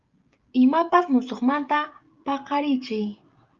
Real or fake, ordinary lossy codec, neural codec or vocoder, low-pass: fake; Opus, 32 kbps; codec, 16 kHz, 16 kbps, FreqCodec, smaller model; 7.2 kHz